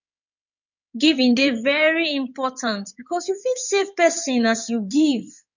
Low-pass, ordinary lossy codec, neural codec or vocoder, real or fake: 7.2 kHz; none; codec, 16 kHz in and 24 kHz out, 2.2 kbps, FireRedTTS-2 codec; fake